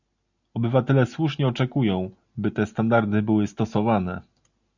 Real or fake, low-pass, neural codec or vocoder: real; 7.2 kHz; none